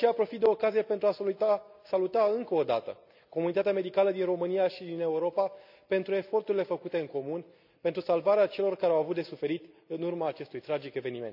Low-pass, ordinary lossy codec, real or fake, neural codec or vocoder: 5.4 kHz; none; real; none